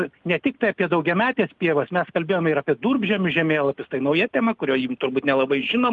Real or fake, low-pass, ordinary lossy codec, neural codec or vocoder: real; 10.8 kHz; Opus, 32 kbps; none